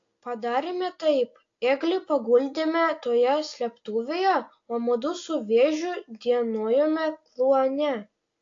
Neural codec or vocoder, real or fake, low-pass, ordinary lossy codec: none; real; 7.2 kHz; AAC, 48 kbps